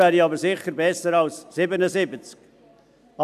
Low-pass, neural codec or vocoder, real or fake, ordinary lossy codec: 14.4 kHz; none; real; AAC, 96 kbps